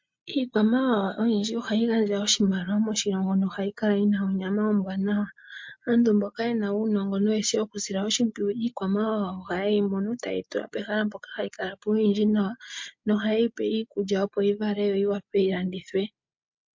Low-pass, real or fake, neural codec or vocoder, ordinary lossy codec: 7.2 kHz; real; none; MP3, 48 kbps